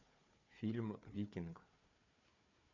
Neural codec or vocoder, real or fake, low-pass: codec, 16 kHz, 4 kbps, FunCodec, trained on Chinese and English, 50 frames a second; fake; 7.2 kHz